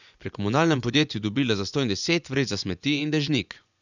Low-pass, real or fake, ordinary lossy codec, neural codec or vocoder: 7.2 kHz; fake; none; vocoder, 24 kHz, 100 mel bands, Vocos